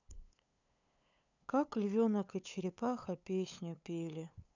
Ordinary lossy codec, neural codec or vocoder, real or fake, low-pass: none; codec, 16 kHz, 8 kbps, FunCodec, trained on LibriTTS, 25 frames a second; fake; 7.2 kHz